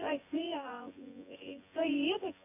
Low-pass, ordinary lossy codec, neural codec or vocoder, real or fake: 3.6 kHz; MP3, 24 kbps; vocoder, 24 kHz, 100 mel bands, Vocos; fake